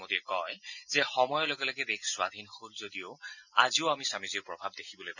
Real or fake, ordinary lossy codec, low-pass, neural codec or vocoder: real; none; 7.2 kHz; none